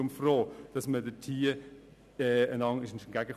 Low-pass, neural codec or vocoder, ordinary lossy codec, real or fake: 14.4 kHz; none; none; real